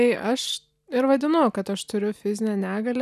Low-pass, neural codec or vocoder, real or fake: 14.4 kHz; none; real